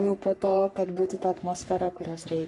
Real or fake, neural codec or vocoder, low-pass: fake; codec, 44.1 kHz, 3.4 kbps, Pupu-Codec; 10.8 kHz